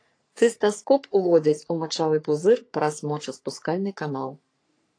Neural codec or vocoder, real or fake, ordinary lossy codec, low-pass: codec, 44.1 kHz, 3.4 kbps, Pupu-Codec; fake; AAC, 48 kbps; 9.9 kHz